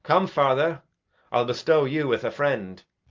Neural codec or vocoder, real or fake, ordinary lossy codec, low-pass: none; real; Opus, 32 kbps; 7.2 kHz